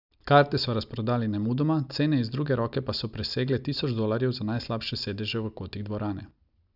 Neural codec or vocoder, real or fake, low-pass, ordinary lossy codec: none; real; 5.4 kHz; none